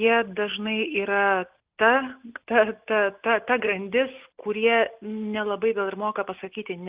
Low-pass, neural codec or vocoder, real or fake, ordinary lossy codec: 3.6 kHz; none; real; Opus, 32 kbps